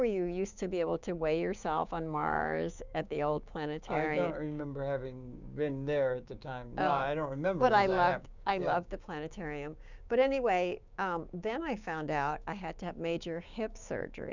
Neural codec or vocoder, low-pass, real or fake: codec, 16 kHz, 6 kbps, DAC; 7.2 kHz; fake